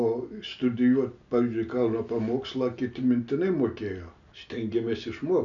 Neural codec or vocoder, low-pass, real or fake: none; 7.2 kHz; real